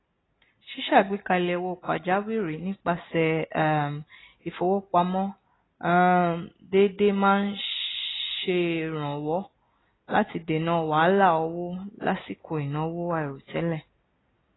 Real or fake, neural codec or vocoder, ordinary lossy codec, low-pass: real; none; AAC, 16 kbps; 7.2 kHz